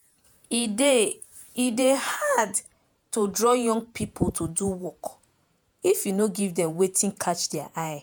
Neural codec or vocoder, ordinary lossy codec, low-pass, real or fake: vocoder, 48 kHz, 128 mel bands, Vocos; none; none; fake